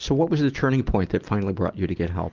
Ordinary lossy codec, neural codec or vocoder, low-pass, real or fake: Opus, 32 kbps; none; 7.2 kHz; real